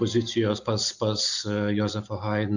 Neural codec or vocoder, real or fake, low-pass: none; real; 7.2 kHz